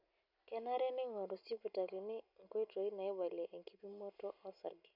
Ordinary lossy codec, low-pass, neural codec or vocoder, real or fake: none; 5.4 kHz; none; real